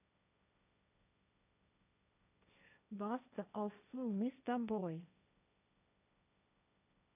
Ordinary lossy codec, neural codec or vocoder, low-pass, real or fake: none; codec, 16 kHz, 1.1 kbps, Voila-Tokenizer; 3.6 kHz; fake